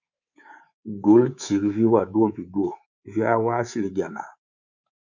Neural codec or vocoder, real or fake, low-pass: codec, 24 kHz, 3.1 kbps, DualCodec; fake; 7.2 kHz